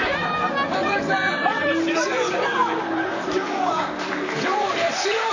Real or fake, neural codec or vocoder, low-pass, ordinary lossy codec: fake; vocoder, 44.1 kHz, 128 mel bands, Pupu-Vocoder; 7.2 kHz; AAC, 48 kbps